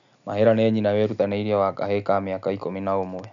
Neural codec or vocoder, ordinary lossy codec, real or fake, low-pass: none; none; real; 7.2 kHz